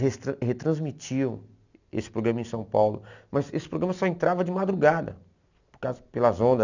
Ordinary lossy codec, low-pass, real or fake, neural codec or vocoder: none; 7.2 kHz; real; none